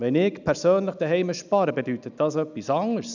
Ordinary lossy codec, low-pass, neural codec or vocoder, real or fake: none; 7.2 kHz; none; real